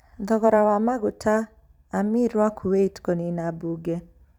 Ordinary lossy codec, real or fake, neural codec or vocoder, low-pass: none; fake; vocoder, 44.1 kHz, 128 mel bands every 512 samples, BigVGAN v2; 19.8 kHz